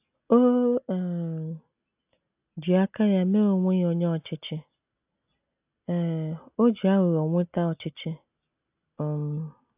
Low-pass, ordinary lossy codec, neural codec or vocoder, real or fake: 3.6 kHz; AAC, 32 kbps; none; real